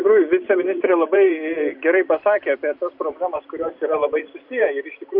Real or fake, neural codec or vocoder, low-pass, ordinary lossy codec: fake; vocoder, 44.1 kHz, 128 mel bands every 256 samples, BigVGAN v2; 5.4 kHz; MP3, 48 kbps